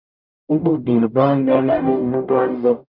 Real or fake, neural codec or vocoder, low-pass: fake; codec, 44.1 kHz, 0.9 kbps, DAC; 5.4 kHz